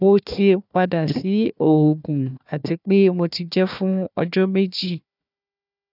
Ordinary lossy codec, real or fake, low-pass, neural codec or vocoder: none; fake; 5.4 kHz; codec, 16 kHz, 1 kbps, FunCodec, trained on Chinese and English, 50 frames a second